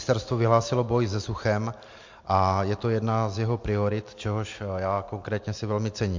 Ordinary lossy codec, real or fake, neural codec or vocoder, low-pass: MP3, 48 kbps; real; none; 7.2 kHz